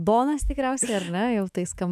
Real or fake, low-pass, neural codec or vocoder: fake; 14.4 kHz; autoencoder, 48 kHz, 128 numbers a frame, DAC-VAE, trained on Japanese speech